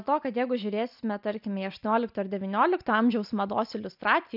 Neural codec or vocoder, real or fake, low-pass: none; real; 5.4 kHz